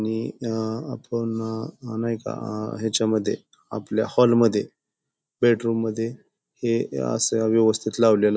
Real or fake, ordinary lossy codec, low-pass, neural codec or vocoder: real; none; none; none